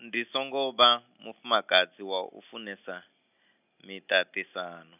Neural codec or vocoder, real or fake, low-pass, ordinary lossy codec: none; real; 3.6 kHz; none